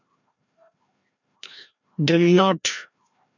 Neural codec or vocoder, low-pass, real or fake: codec, 16 kHz, 1 kbps, FreqCodec, larger model; 7.2 kHz; fake